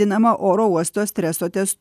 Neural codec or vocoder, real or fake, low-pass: none; real; 14.4 kHz